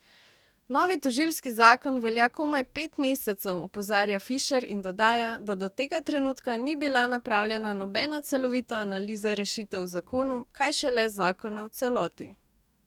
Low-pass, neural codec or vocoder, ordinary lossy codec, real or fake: 19.8 kHz; codec, 44.1 kHz, 2.6 kbps, DAC; none; fake